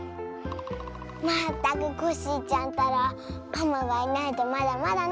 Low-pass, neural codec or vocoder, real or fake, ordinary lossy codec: none; none; real; none